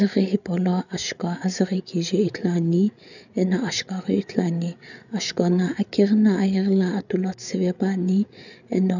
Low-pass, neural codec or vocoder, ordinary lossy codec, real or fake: 7.2 kHz; codec, 16 kHz, 8 kbps, FreqCodec, larger model; none; fake